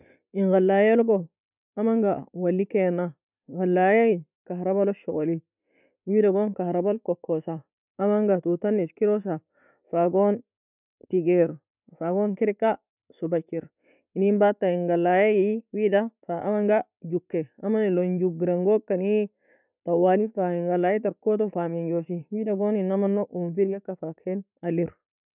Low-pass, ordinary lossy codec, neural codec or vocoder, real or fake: 3.6 kHz; none; none; real